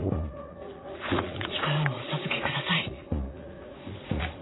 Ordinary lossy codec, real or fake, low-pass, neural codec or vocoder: AAC, 16 kbps; fake; 7.2 kHz; vocoder, 44.1 kHz, 80 mel bands, Vocos